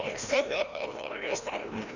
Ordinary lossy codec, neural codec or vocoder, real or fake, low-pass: none; codec, 16 kHz, 1 kbps, FreqCodec, larger model; fake; 7.2 kHz